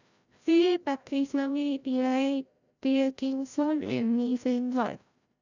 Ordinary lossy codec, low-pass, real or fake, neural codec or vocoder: none; 7.2 kHz; fake; codec, 16 kHz, 0.5 kbps, FreqCodec, larger model